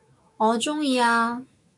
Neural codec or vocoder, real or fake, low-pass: autoencoder, 48 kHz, 128 numbers a frame, DAC-VAE, trained on Japanese speech; fake; 10.8 kHz